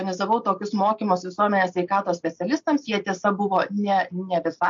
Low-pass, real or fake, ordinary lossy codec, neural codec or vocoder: 7.2 kHz; real; MP3, 48 kbps; none